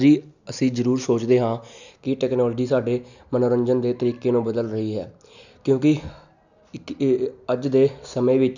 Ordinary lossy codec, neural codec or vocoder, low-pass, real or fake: none; none; 7.2 kHz; real